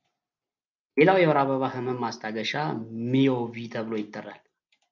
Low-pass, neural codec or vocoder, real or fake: 7.2 kHz; none; real